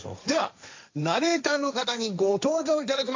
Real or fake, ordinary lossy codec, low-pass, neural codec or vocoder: fake; AAC, 48 kbps; 7.2 kHz; codec, 16 kHz, 1.1 kbps, Voila-Tokenizer